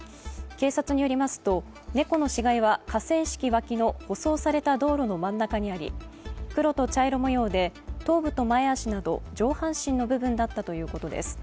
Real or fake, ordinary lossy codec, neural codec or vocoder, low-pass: real; none; none; none